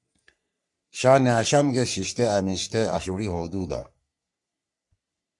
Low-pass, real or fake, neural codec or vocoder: 10.8 kHz; fake; codec, 44.1 kHz, 3.4 kbps, Pupu-Codec